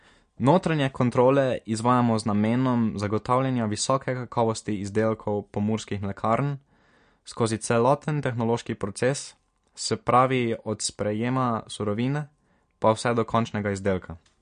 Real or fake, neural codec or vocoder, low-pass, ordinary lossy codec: real; none; 9.9 kHz; MP3, 48 kbps